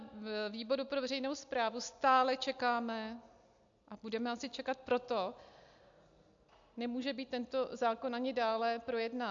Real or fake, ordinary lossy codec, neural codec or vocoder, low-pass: real; AAC, 64 kbps; none; 7.2 kHz